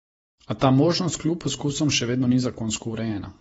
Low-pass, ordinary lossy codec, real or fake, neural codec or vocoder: 19.8 kHz; AAC, 24 kbps; fake; vocoder, 48 kHz, 128 mel bands, Vocos